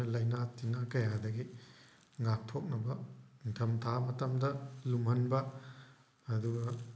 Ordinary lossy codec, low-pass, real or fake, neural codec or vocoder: none; none; real; none